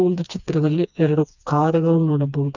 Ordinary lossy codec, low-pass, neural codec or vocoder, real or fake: none; 7.2 kHz; codec, 16 kHz, 2 kbps, FreqCodec, smaller model; fake